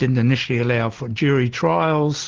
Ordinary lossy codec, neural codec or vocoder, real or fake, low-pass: Opus, 16 kbps; none; real; 7.2 kHz